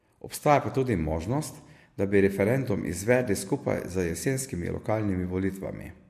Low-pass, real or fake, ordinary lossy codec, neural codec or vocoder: 14.4 kHz; real; MP3, 64 kbps; none